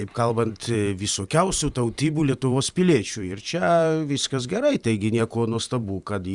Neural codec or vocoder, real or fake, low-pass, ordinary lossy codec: vocoder, 44.1 kHz, 128 mel bands every 512 samples, BigVGAN v2; fake; 10.8 kHz; Opus, 64 kbps